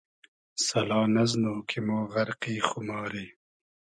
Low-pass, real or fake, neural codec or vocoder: 9.9 kHz; real; none